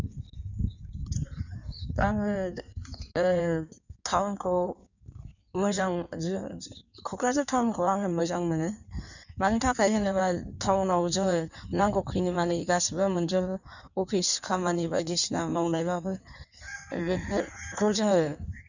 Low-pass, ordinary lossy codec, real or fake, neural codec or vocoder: 7.2 kHz; none; fake; codec, 16 kHz in and 24 kHz out, 1.1 kbps, FireRedTTS-2 codec